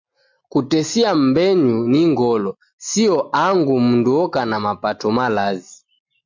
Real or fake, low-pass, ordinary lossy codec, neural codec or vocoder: real; 7.2 kHz; MP3, 48 kbps; none